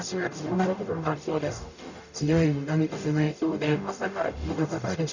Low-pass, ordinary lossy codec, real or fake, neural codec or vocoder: 7.2 kHz; none; fake; codec, 44.1 kHz, 0.9 kbps, DAC